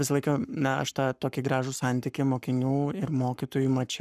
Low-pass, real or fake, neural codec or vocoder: 14.4 kHz; fake; codec, 44.1 kHz, 7.8 kbps, Pupu-Codec